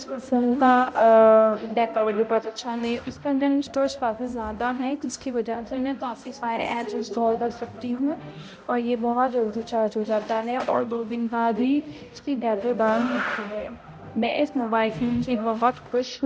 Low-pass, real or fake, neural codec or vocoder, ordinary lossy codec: none; fake; codec, 16 kHz, 0.5 kbps, X-Codec, HuBERT features, trained on balanced general audio; none